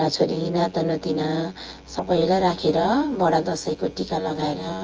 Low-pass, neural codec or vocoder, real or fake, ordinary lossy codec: 7.2 kHz; vocoder, 24 kHz, 100 mel bands, Vocos; fake; Opus, 24 kbps